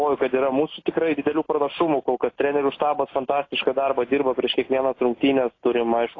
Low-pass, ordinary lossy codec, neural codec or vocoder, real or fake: 7.2 kHz; AAC, 32 kbps; none; real